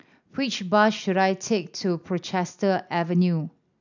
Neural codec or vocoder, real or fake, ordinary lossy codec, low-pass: none; real; none; 7.2 kHz